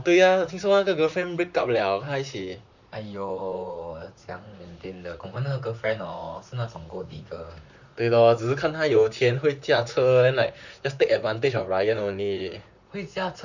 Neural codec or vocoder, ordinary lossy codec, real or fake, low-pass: vocoder, 44.1 kHz, 128 mel bands, Pupu-Vocoder; none; fake; 7.2 kHz